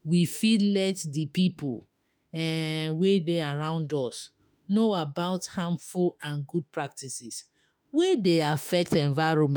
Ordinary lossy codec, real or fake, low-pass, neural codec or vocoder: none; fake; none; autoencoder, 48 kHz, 32 numbers a frame, DAC-VAE, trained on Japanese speech